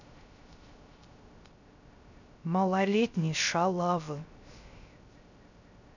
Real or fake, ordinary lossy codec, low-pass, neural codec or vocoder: fake; none; 7.2 kHz; codec, 16 kHz, 0.3 kbps, FocalCodec